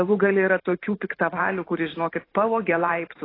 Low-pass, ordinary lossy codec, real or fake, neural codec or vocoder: 5.4 kHz; AAC, 24 kbps; fake; vocoder, 44.1 kHz, 128 mel bands every 512 samples, BigVGAN v2